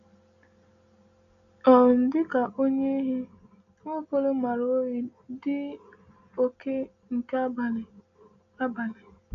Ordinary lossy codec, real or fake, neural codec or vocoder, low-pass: none; real; none; 7.2 kHz